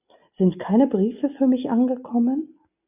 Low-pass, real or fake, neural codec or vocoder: 3.6 kHz; real; none